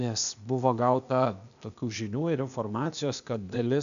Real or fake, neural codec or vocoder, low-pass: fake; codec, 16 kHz, 0.8 kbps, ZipCodec; 7.2 kHz